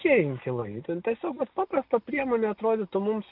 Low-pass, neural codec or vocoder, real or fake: 5.4 kHz; none; real